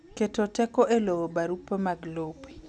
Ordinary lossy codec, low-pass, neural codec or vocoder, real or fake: none; none; none; real